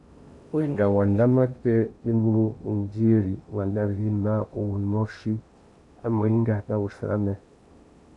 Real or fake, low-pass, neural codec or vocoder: fake; 10.8 kHz; codec, 16 kHz in and 24 kHz out, 0.6 kbps, FocalCodec, streaming, 2048 codes